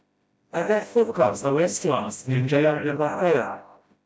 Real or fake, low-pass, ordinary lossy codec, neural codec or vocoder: fake; none; none; codec, 16 kHz, 0.5 kbps, FreqCodec, smaller model